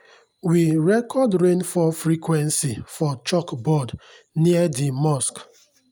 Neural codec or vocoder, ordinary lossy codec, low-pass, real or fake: none; none; none; real